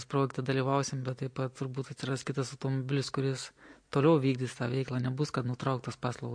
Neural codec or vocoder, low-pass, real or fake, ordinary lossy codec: none; 9.9 kHz; real; MP3, 48 kbps